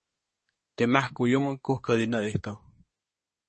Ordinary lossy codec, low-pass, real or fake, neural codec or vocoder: MP3, 32 kbps; 10.8 kHz; fake; codec, 24 kHz, 1 kbps, SNAC